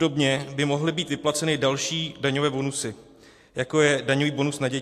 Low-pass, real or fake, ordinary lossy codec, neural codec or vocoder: 14.4 kHz; real; AAC, 64 kbps; none